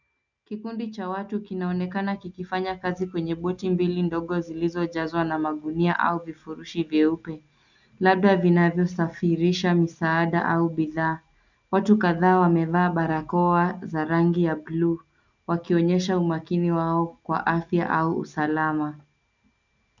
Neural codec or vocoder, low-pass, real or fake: none; 7.2 kHz; real